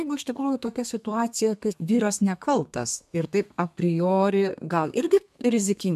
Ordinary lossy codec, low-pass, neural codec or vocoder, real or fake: MP3, 96 kbps; 14.4 kHz; codec, 32 kHz, 1.9 kbps, SNAC; fake